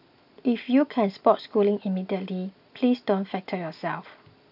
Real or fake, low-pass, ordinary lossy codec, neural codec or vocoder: real; 5.4 kHz; none; none